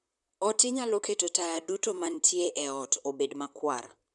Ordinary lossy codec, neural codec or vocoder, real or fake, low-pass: none; vocoder, 44.1 kHz, 128 mel bands, Pupu-Vocoder; fake; 10.8 kHz